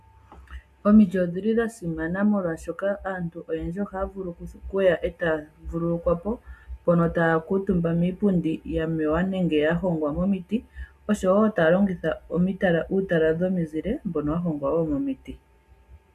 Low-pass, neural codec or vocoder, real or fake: 14.4 kHz; none; real